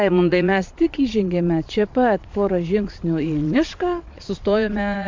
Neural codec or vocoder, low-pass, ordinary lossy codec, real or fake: vocoder, 22.05 kHz, 80 mel bands, Vocos; 7.2 kHz; MP3, 64 kbps; fake